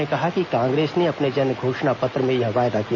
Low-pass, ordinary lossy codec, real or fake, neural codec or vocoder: 7.2 kHz; MP3, 48 kbps; real; none